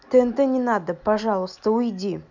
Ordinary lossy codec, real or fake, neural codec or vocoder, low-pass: none; real; none; 7.2 kHz